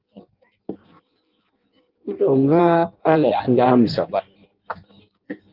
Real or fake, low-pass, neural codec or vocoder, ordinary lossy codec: fake; 5.4 kHz; codec, 16 kHz in and 24 kHz out, 0.6 kbps, FireRedTTS-2 codec; Opus, 32 kbps